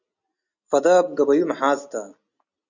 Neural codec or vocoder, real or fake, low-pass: none; real; 7.2 kHz